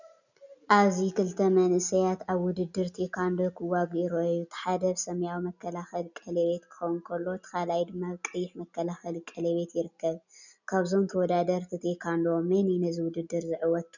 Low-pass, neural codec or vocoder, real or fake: 7.2 kHz; none; real